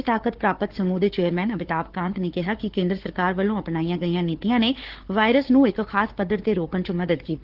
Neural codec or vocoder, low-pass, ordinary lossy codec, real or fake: codec, 16 kHz, 4 kbps, FunCodec, trained on Chinese and English, 50 frames a second; 5.4 kHz; Opus, 16 kbps; fake